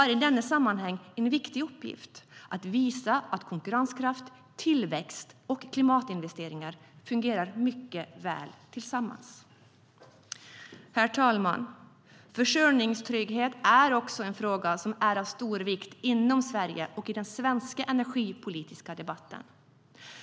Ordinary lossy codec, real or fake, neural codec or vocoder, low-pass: none; real; none; none